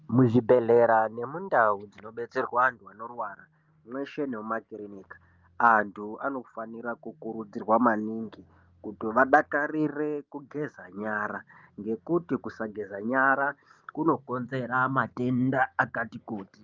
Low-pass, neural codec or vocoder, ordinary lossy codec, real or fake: 7.2 kHz; none; Opus, 24 kbps; real